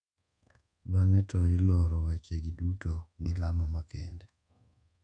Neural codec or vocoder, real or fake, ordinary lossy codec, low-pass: codec, 24 kHz, 1.2 kbps, DualCodec; fake; none; 9.9 kHz